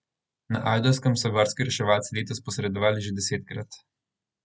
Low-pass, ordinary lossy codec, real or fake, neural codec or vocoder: none; none; real; none